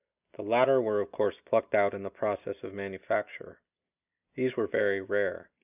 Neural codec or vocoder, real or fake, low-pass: none; real; 3.6 kHz